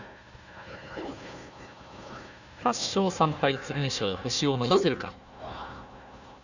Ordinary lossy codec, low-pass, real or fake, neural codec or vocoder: none; 7.2 kHz; fake; codec, 16 kHz, 1 kbps, FunCodec, trained on Chinese and English, 50 frames a second